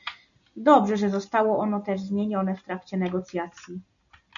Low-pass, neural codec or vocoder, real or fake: 7.2 kHz; none; real